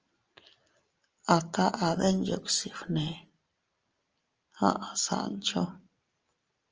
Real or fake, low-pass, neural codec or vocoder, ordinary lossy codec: real; 7.2 kHz; none; Opus, 24 kbps